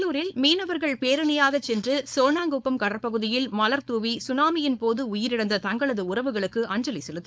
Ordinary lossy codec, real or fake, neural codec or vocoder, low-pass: none; fake; codec, 16 kHz, 4.8 kbps, FACodec; none